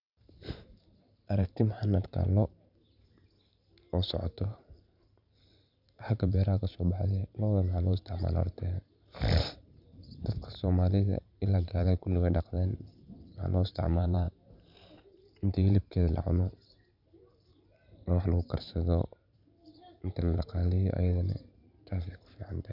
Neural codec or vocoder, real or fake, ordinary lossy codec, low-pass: vocoder, 44.1 kHz, 128 mel bands every 512 samples, BigVGAN v2; fake; none; 5.4 kHz